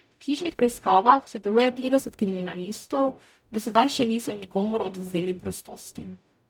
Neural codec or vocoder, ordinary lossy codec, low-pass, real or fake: codec, 44.1 kHz, 0.9 kbps, DAC; none; none; fake